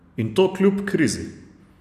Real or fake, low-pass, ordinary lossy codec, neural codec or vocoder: real; 14.4 kHz; none; none